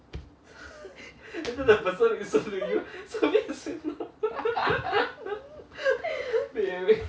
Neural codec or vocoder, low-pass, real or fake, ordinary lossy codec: none; none; real; none